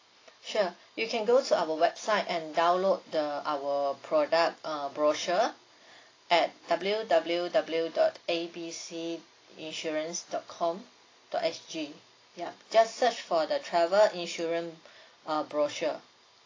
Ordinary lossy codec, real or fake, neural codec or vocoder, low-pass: AAC, 32 kbps; real; none; 7.2 kHz